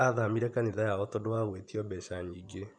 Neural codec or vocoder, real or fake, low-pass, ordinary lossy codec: vocoder, 24 kHz, 100 mel bands, Vocos; fake; 9.9 kHz; none